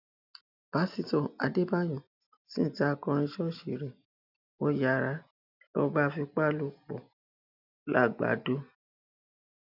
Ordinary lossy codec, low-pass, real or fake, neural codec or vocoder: none; 5.4 kHz; real; none